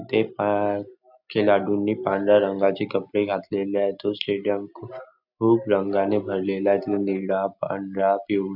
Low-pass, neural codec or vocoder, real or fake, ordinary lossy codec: 5.4 kHz; none; real; none